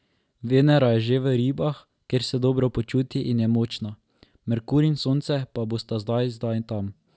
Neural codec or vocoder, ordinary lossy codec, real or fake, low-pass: none; none; real; none